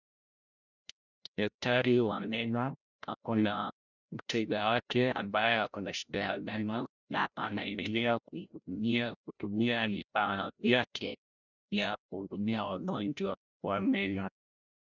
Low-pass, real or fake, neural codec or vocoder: 7.2 kHz; fake; codec, 16 kHz, 0.5 kbps, FreqCodec, larger model